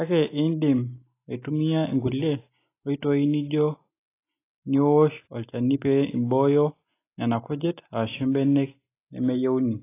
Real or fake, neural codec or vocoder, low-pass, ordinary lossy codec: real; none; 3.6 kHz; AAC, 24 kbps